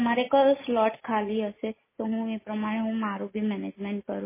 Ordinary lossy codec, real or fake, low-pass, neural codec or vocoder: MP3, 16 kbps; real; 3.6 kHz; none